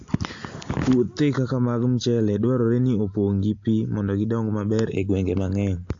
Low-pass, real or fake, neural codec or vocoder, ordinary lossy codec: 7.2 kHz; real; none; AAC, 48 kbps